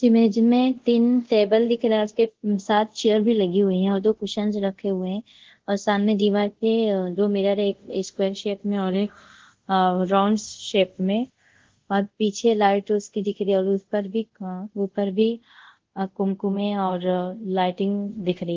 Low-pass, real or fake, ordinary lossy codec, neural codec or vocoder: 7.2 kHz; fake; Opus, 16 kbps; codec, 24 kHz, 0.5 kbps, DualCodec